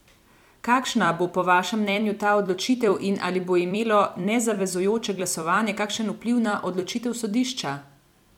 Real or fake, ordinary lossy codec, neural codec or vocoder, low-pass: fake; MP3, 96 kbps; vocoder, 44.1 kHz, 128 mel bands every 256 samples, BigVGAN v2; 19.8 kHz